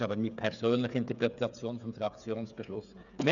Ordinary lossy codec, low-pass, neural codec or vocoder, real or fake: none; 7.2 kHz; codec, 16 kHz, 8 kbps, FreqCodec, smaller model; fake